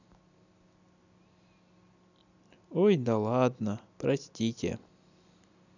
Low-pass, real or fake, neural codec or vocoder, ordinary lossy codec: 7.2 kHz; real; none; none